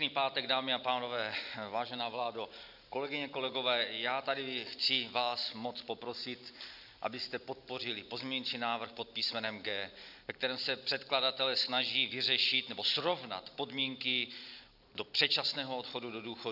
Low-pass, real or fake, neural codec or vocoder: 5.4 kHz; real; none